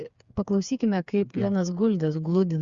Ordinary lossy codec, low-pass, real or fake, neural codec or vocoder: Opus, 64 kbps; 7.2 kHz; fake; codec, 16 kHz, 4 kbps, FreqCodec, smaller model